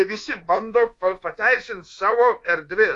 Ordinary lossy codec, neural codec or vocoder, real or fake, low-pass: AAC, 48 kbps; codec, 24 kHz, 1.2 kbps, DualCodec; fake; 10.8 kHz